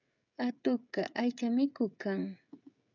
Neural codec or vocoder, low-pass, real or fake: codec, 16 kHz, 16 kbps, FreqCodec, smaller model; 7.2 kHz; fake